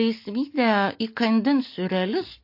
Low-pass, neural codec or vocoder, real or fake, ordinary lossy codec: 5.4 kHz; none; real; MP3, 32 kbps